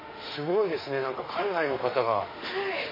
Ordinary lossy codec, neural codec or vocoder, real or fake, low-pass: MP3, 24 kbps; autoencoder, 48 kHz, 32 numbers a frame, DAC-VAE, trained on Japanese speech; fake; 5.4 kHz